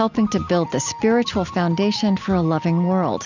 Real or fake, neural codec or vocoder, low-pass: real; none; 7.2 kHz